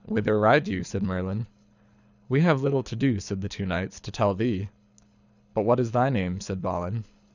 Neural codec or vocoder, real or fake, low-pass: codec, 24 kHz, 6 kbps, HILCodec; fake; 7.2 kHz